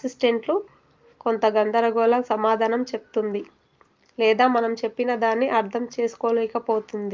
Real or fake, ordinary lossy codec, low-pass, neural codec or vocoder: real; Opus, 32 kbps; 7.2 kHz; none